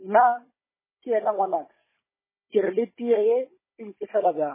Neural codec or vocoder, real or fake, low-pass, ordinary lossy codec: codec, 16 kHz, 16 kbps, FunCodec, trained on Chinese and English, 50 frames a second; fake; 3.6 kHz; MP3, 16 kbps